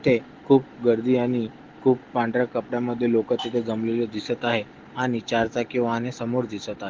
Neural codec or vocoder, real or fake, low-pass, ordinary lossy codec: none; real; 7.2 kHz; Opus, 16 kbps